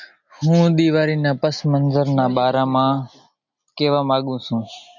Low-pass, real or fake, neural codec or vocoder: 7.2 kHz; real; none